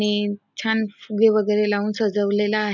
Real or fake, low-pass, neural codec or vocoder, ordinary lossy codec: real; 7.2 kHz; none; none